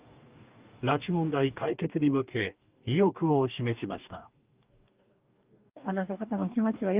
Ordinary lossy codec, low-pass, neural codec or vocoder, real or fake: Opus, 24 kbps; 3.6 kHz; codec, 44.1 kHz, 2.6 kbps, DAC; fake